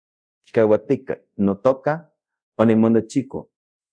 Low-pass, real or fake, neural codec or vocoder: 9.9 kHz; fake; codec, 24 kHz, 0.5 kbps, DualCodec